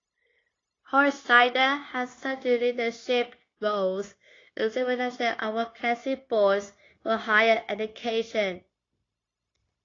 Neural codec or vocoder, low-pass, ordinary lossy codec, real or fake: codec, 16 kHz, 0.9 kbps, LongCat-Audio-Codec; 7.2 kHz; AAC, 32 kbps; fake